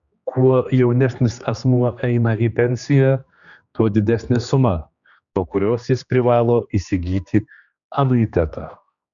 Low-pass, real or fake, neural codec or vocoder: 7.2 kHz; fake; codec, 16 kHz, 2 kbps, X-Codec, HuBERT features, trained on general audio